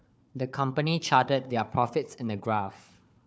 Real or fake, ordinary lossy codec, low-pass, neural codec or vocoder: fake; none; none; codec, 16 kHz, 4 kbps, FunCodec, trained on Chinese and English, 50 frames a second